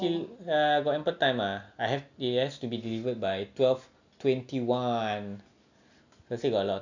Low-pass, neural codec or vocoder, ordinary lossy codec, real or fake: 7.2 kHz; none; none; real